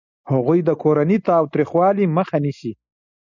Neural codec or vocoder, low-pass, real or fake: none; 7.2 kHz; real